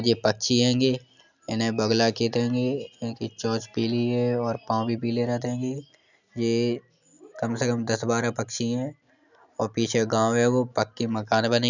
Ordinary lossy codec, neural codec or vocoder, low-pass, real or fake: none; none; 7.2 kHz; real